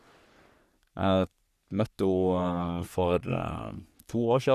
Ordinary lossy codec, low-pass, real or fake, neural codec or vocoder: none; 14.4 kHz; fake; codec, 44.1 kHz, 3.4 kbps, Pupu-Codec